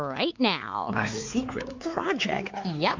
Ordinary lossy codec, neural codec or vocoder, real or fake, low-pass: MP3, 48 kbps; codec, 16 kHz, 4 kbps, X-Codec, WavLM features, trained on Multilingual LibriSpeech; fake; 7.2 kHz